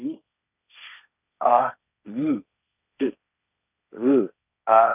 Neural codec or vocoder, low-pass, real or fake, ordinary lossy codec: codec, 16 kHz, 1.1 kbps, Voila-Tokenizer; 3.6 kHz; fake; none